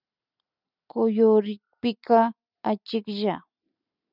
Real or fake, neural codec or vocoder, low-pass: real; none; 5.4 kHz